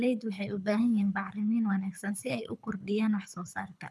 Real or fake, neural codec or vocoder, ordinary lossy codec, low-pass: fake; codec, 24 kHz, 6 kbps, HILCodec; none; none